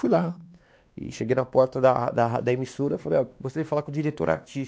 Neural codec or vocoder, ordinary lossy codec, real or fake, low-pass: codec, 16 kHz, 2 kbps, X-Codec, WavLM features, trained on Multilingual LibriSpeech; none; fake; none